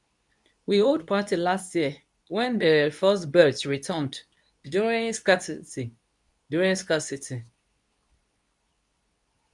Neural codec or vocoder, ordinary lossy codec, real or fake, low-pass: codec, 24 kHz, 0.9 kbps, WavTokenizer, medium speech release version 2; none; fake; 10.8 kHz